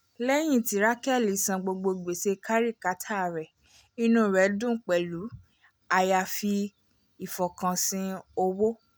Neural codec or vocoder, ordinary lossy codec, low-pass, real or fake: none; none; none; real